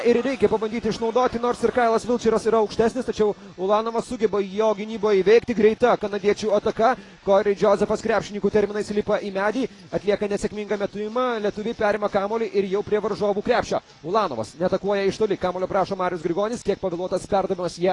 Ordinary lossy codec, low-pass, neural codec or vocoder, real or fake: AAC, 32 kbps; 10.8 kHz; none; real